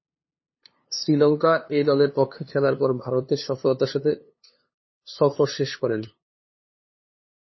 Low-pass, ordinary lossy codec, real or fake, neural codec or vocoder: 7.2 kHz; MP3, 24 kbps; fake; codec, 16 kHz, 2 kbps, FunCodec, trained on LibriTTS, 25 frames a second